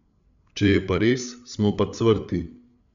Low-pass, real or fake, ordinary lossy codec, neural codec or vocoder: 7.2 kHz; fake; none; codec, 16 kHz, 8 kbps, FreqCodec, larger model